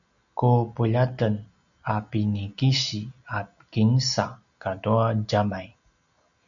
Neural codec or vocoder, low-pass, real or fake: none; 7.2 kHz; real